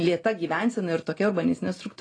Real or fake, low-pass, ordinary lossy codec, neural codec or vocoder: real; 9.9 kHz; AAC, 32 kbps; none